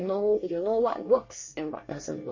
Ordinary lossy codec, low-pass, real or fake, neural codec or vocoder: MP3, 32 kbps; 7.2 kHz; fake; codec, 24 kHz, 1 kbps, SNAC